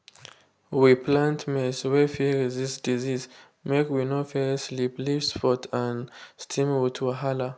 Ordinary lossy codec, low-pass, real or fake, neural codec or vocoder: none; none; real; none